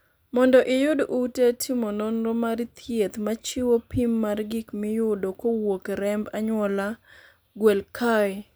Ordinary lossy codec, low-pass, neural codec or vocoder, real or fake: none; none; none; real